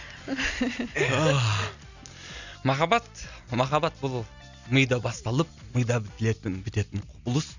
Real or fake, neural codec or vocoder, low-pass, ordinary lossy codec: real; none; 7.2 kHz; none